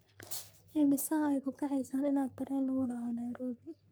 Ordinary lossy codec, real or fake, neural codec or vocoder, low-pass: none; fake; codec, 44.1 kHz, 3.4 kbps, Pupu-Codec; none